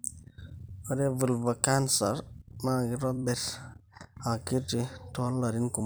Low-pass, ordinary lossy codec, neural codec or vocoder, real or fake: none; none; vocoder, 44.1 kHz, 128 mel bands every 256 samples, BigVGAN v2; fake